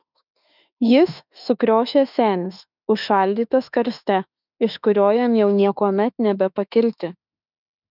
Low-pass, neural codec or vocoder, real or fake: 5.4 kHz; autoencoder, 48 kHz, 32 numbers a frame, DAC-VAE, trained on Japanese speech; fake